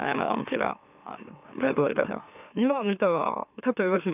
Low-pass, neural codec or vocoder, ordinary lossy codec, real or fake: 3.6 kHz; autoencoder, 44.1 kHz, a latent of 192 numbers a frame, MeloTTS; none; fake